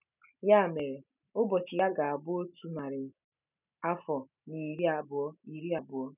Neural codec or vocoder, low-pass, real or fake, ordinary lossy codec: none; 3.6 kHz; real; none